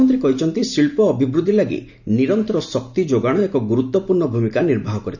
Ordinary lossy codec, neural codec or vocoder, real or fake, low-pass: none; none; real; 7.2 kHz